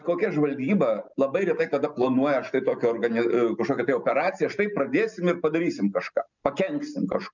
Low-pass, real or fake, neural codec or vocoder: 7.2 kHz; real; none